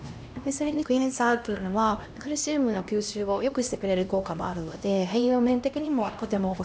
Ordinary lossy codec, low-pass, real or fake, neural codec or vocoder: none; none; fake; codec, 16 kHz, 1 kbps, X-Codec, HuBERT features, trained on LibriSpeech